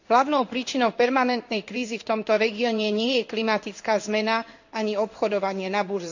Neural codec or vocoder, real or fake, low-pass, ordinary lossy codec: codec, 16 kHz in and 24 kHz out, 1 kbps, XY-Tokenizer; fake; 7.2 kHz; none